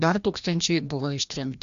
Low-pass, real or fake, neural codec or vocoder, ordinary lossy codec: 7.2 kHz; fake; codec, 16 kHz, 1 kbps, FreqCodec, larger model; AAC, 96 kbps